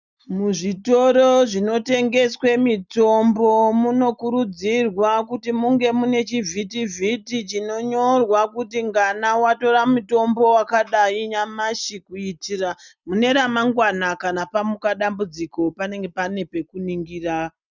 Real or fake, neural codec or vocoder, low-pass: real; none; 7.2 kHz